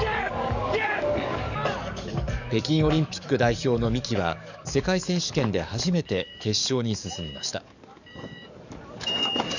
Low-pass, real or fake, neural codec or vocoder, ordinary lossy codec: 7.2 kHz; fake; codec, 44.1 kHz, 7.8 kbps, DAC; none